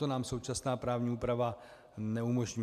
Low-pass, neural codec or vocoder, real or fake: 14.4 kHz; none; real